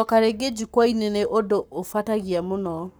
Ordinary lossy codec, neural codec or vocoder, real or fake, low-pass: none; codec, 44.1 kHz, 7.8 kbps, Pupu-Codec; fake; none